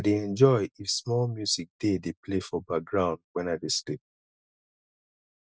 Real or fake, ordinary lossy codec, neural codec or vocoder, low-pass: real; none; none; none